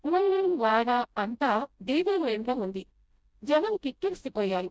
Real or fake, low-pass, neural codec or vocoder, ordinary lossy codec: fake; none; codec, 16 kHz, 0.5 kbps, FreqCodec, smaller model; none